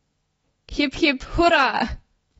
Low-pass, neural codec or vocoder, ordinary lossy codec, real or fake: 19.8 kHz; autoencoder, 48 kHz, 128 numbers a frame, DAC-VAE, trained on Japanese speech; AAC, 24 kbps; fake